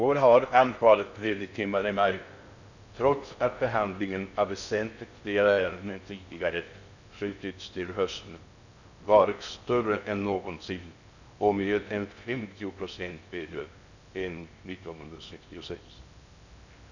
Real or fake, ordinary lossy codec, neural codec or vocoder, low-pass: fake; none; codec, 16 kHz in and 24 kHz out, 0.6 kbps, FocalCodec, streaming, 4096 codes; 7.2 kHz